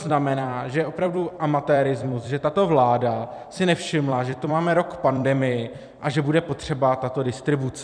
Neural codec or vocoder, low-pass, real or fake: vocoder, 44.1 kHz, 128 mel bands every 256 samples, BigVGAN v2; 9.9 kHz; fake